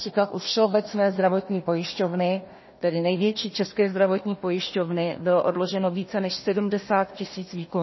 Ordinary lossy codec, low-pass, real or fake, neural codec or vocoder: MP3, 24 kbps; 7.2 kHz; fake; codec, 16 kHz, 1 kbps, FunCodec, trained on Chinese and English, 50 frames a second